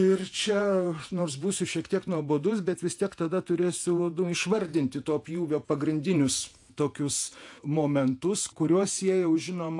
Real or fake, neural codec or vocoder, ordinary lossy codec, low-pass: fake; vocoder, 48 kHz, 128 mel bands, Vocos; AAC, 64 kbps; 10.8 kHz